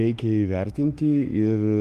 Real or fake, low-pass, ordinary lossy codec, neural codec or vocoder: fake; 14.4 kHz; Opus, 32 kbps; autoencoder, 48 kHz, 32 numbers a frame, DAC-VAE, trained on Japanese speech